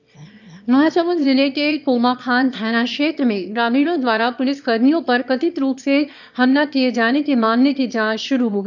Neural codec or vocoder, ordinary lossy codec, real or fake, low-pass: autoencoder, 22.05 kHz, a latent of 192 numbers a frame, VITS, trained on one speaker; none; fake; 7.2 kHz